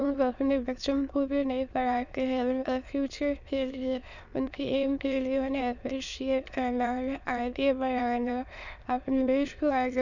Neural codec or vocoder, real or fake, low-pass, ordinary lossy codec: autoencoder, 22.05 kHz, a latent of 192 numbers a frame, VITS, trained on many speakers; fake; 7.2 kHz; none